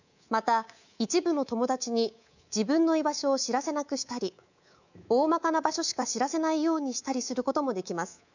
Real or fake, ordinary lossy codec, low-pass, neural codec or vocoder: fake; none; 7.2 kHz; codec, 24 kHz, 3.1 kbps, DualCodec